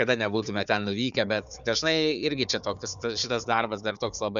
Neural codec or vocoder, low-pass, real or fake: codec, 16 kHz, 4 kbps, FunCodec, trained on Chinese and English, 50 frames a second; 7.2 kHz; fake